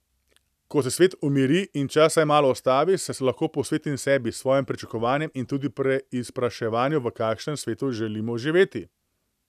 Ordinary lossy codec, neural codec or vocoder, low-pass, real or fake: none; none; 14.4 kHz; real